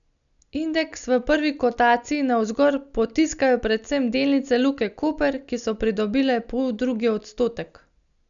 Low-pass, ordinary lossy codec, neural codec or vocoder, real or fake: 7.2 kHz; none; none; real